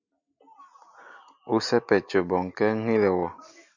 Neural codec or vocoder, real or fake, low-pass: none; real; 7.2 kHz